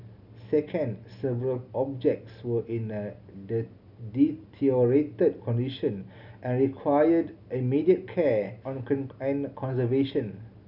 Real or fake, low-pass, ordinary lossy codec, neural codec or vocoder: real; 5.4 kHz; none; none